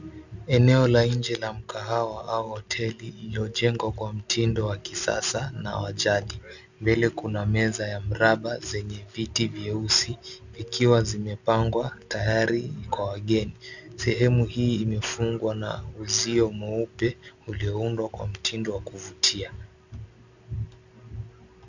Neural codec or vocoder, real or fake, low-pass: none; real; 7.2 kHz